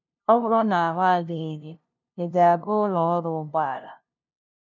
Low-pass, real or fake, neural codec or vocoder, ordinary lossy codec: 7.2 kHz; fake; codec, 16 kHz, 0.5 kbps, FunCodec, trained on LibriTTS, 25 frames a second; none